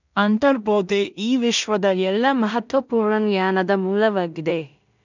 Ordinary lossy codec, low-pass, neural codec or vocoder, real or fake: none; 7.2 kHz; codec, 16 kHz in and 24 kHz out, 0.4 kbps, LongCat-Audio-Codec, two codebook decoder; fake